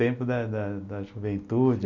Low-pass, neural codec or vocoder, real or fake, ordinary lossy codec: 7.2 kHz; none; real; MP3, 64 kbps